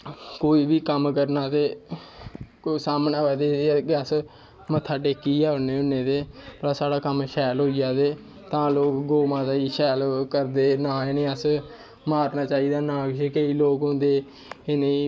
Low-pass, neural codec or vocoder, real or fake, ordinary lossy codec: none; none; real; none